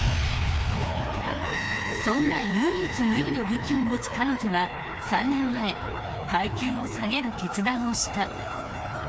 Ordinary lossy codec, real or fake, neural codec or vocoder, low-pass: none; fake; codec, 16 kHz, 2 kbps, FreqCodec, larger model; none